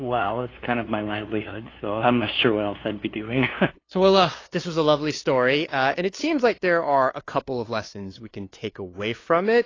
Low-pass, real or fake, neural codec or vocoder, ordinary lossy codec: 7.2 kHz; fake; codec, 16 kHz, 2 kbps, FunCodec, trained on LibriTTS, 25 frames a second; AAC, 32 kbps